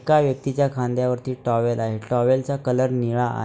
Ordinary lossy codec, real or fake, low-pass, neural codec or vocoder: none; real; none; none